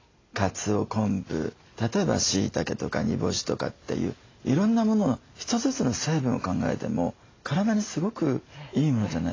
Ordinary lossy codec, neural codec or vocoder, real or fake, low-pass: AAC, 32 kbps; none; real; 7.2 kHz